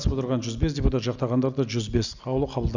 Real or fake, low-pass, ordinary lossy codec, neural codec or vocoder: real; 7.2 kHz; none; none